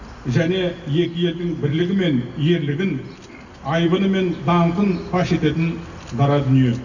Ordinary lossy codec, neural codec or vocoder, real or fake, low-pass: none; none; real; 7.2 kHz